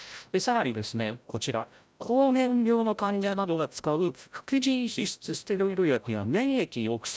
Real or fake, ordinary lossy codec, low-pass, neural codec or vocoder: fake; none; none; codec, 16 kHz, 0.5 kbps, FreqCodec, larger model